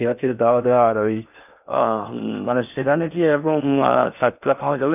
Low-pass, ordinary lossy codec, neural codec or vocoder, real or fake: 3.6 kHz; none; codec, 16 kHz in and 24 kHz out, 0.6 kbps, FocalCodec, streaming, 4096 codes; fake